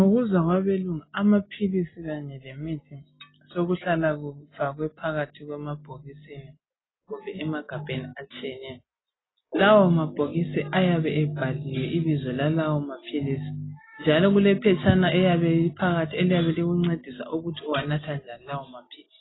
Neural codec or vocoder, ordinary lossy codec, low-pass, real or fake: none; AAC, 16 kbps; 7.2 kHz; real